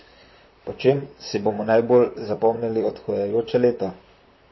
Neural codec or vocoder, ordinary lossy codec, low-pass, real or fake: vocoder, 44.1 kHz, 128 mel bands, Pupu-Vocoder; MP3, 24 kbps; 7.2 kHz; fake